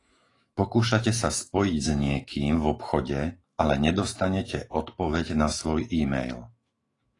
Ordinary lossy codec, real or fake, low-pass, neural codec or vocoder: AAC, 32 kbps; fake; 10.8 kHz; autoencoder, 48 kHz, 128 numbers a frame, DAC-VAE, trained on Japanese speech